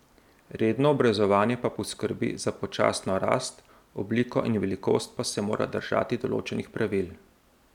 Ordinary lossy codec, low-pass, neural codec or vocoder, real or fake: none; 19.8 kHz; none; real